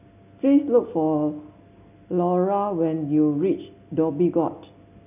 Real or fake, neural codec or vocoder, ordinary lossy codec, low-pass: real; none; none; 3.6 kHz